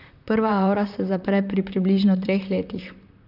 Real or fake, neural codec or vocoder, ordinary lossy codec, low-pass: fake; vocoder, 44.1 kHz, 128 mel bands, Pupu-Vocoder; none; 5.4 kHz